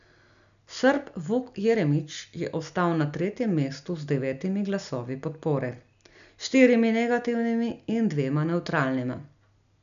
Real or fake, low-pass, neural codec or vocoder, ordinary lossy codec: real; 7.2 kHz; none; none